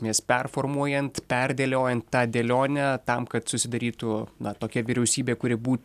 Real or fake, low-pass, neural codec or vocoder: real; 14.4 kHz; none